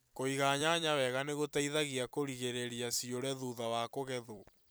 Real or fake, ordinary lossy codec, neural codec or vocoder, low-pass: fake; none; vocoder, 44.1 kHz, 128 mel bands every 256 samples, BigVGAN v2; none